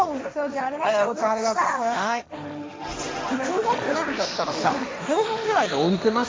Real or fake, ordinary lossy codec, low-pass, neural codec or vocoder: fake; none; none; codec, 16 kHz, 1.1 kbps, Voila-Tokenizer